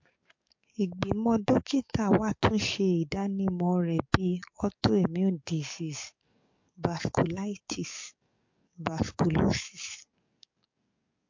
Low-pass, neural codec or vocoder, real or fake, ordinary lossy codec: 7.2 kHz; codec, 44.1 kHz, 7.8 kbps, DAC; fake; MP3, 48 kbps